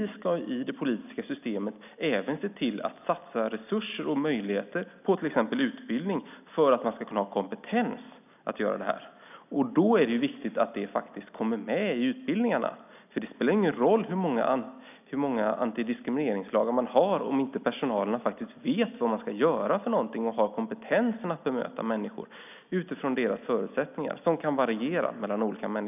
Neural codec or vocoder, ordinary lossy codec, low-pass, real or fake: none; none; 3.6 kHz; real